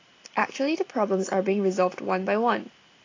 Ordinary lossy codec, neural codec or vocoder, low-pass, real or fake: AAC, 32 kbps; none; 7.2 kHz; real